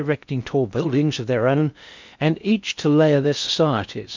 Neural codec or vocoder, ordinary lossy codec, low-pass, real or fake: codec, 16 kHz in and 24 kHz out, 0.6 kbps, FocalCodec, streaming, 2048 codes; MP3, 64 kbps; 7.2 kHz; fake